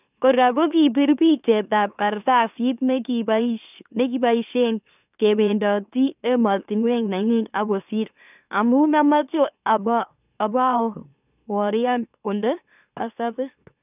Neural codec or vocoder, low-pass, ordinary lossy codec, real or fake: autoencoder, 44.1 kHz, a latent of 192 numbers a frame, MeloTTS; 3.6 kHz; none; fake